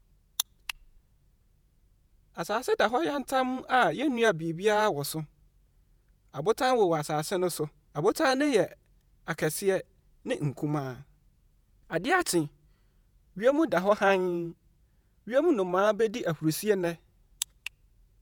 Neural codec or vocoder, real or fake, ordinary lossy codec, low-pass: vocoder, 48 kHz, 128 mel bands, Vocos; fake; none; none